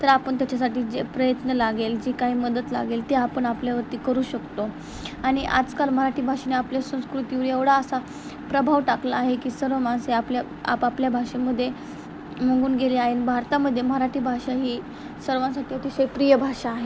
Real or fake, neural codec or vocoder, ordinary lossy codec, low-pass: real; none; none; none